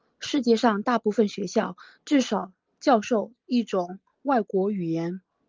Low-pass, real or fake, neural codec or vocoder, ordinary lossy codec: 7.2 kHz; real; none; Opus, 32 kbps